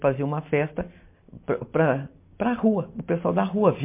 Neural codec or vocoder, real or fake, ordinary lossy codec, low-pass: none; real; MP3, 24 kbps; 3.6 kHz